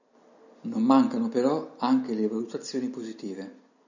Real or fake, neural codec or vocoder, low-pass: real; none; 7.2 kHz